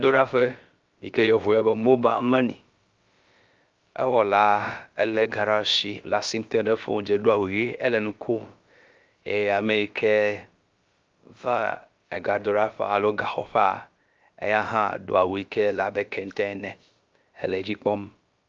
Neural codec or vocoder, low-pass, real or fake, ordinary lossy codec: codec, 16 kHz, about 1 kbps, DyCAST, with the encoder's durations; 7.2 kHz; fake; Opus, 24 kbps